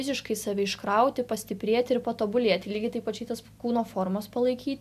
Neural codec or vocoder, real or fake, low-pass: none; real; 14.4 kHz